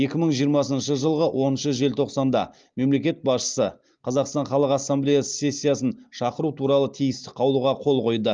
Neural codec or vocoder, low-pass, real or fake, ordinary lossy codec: none; 7.2 kHz; real; Opus, 32 kbps